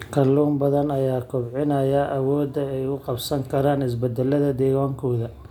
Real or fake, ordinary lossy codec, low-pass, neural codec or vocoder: real; none; 19.8 kHz; none